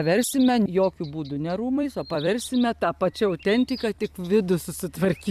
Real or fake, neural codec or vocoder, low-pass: fake; vocoder, 44.1 kHz, 128 mel bands every 256 samples, BigVGAN v2; 14.4 kHz